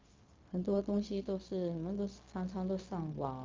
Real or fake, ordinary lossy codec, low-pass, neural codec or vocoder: fake; Opus, 32 kbps; 7.2 kHz; codec, 16 kHz, 0.4 kbps, LongCat-Audio-Codec